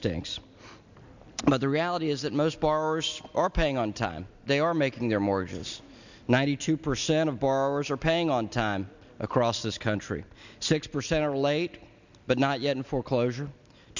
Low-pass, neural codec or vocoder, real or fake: 7.2 kHz; none; real